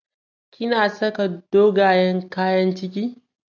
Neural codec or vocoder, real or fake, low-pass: none; real; 7.2 kHz